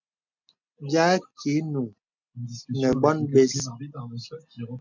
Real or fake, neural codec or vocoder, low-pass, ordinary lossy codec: real; none; 7.2 kHz; MP3, 64 kbps